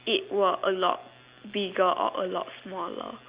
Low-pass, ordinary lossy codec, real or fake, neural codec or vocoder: 3.6 kHz; Opus, 64 kbps; real; none